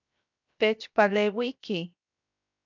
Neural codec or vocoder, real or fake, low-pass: codec, 16 kHz, 0.7 kbps, FocalCodec; fake; 7.2 kHz